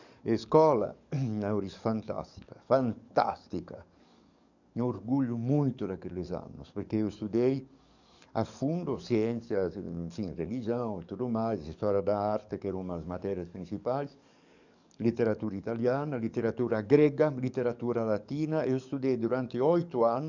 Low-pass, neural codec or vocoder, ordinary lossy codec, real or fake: 7.2 kHz; codec, 44.1 kHz, 7.8 kbps, DAC; none; fake